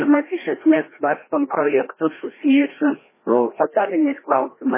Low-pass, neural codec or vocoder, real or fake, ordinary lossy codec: 3.6 kHz; codec, 16 kHz, 1 kbps, FreqCodec, larger model; fake; MP3, 16 kbps